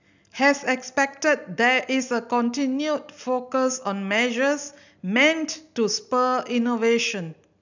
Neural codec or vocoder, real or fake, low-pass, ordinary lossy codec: none; real; 7.2 kHz; none